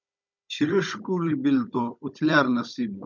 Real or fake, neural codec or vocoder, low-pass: fake; codec, 16 kHz, 16 kbps, FunCodec, trained on Chinese and English, 50 frames a second; 7.2 kHz